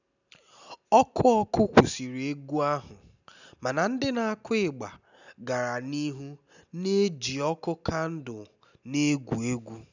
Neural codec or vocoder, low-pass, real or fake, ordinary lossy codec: none; 7.2 kHz; real; none